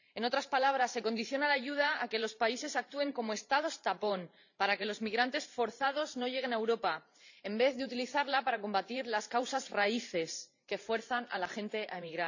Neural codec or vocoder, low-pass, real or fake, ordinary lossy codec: vocoder, 44.1 kHz, 128 mel bands every 512 samples, BigVGAN v2; 7.2 kHz; fake; none